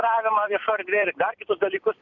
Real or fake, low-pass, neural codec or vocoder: fake; 7.2 kHz; vocoder, 44.1 kHz, 128 mel bands every 256 samples, BigVGAN v2